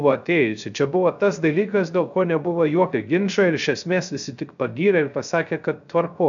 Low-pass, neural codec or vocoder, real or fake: 7.2 kHz; codec, 16 kHz, 0.3 kbps, FocalCodec; fake